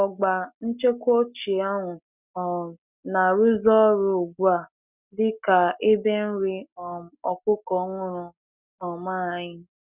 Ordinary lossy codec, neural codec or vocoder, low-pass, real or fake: none; none; 3.6 kHz; real